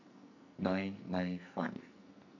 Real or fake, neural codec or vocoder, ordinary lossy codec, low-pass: fake; codec, 44.1 kHz, 2.6 kbps, SNAC; none; 7.2 kHz